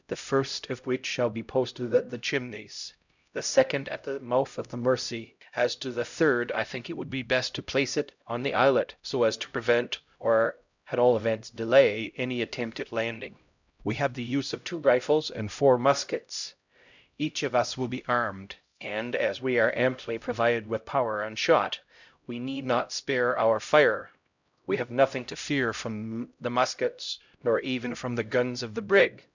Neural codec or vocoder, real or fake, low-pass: codec, 16 kHz, 0.5 kbps, X-Codec, HuBERT features, trained on LibriSpeech; fake; 7.2 kHz